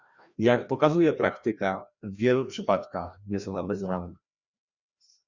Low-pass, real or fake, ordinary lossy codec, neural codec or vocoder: 7.2 kHz; fake; Opus, 64 kbps; codec, 16 kHz, 1 kbps, FreqCodec, larger model